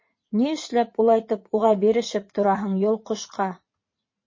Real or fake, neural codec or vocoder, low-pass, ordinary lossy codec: fake; vocoder, 44.1 kHz, 80 mel bands, Vocos; 7.2 kHz; MP3, 32 kbps